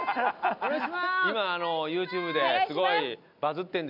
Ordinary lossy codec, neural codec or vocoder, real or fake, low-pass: none; none; real; 5.4 kHz